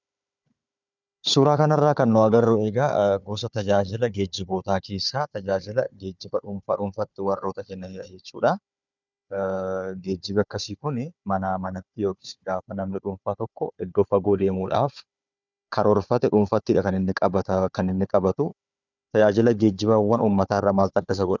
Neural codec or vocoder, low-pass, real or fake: codec, 16 kHz, 4 kbps, FunCodec, trained on Chinese and English, 50 frames a second; 7.2 kHz; fake